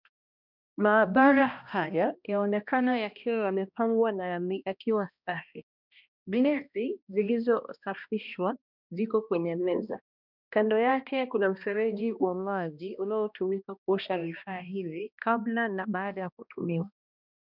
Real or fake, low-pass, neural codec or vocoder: fake; 5.4 kHz; codec, 16 kHz, 1 kbps, X-Codec, HuBERT features, trained on balanced general audio